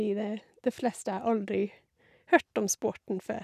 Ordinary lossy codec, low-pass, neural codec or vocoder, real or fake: none; 14.4 kHz; none; real